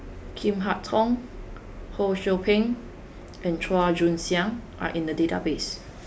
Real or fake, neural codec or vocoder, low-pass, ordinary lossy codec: real; none; none; none